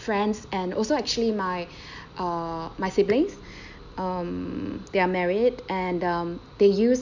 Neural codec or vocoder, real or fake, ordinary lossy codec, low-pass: none; real; none; 7.2 kHz